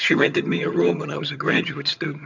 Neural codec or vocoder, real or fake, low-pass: vocoder, 22.05 kHz, 80 mel bands, HiFi-GAN; fake; 7.2 kHz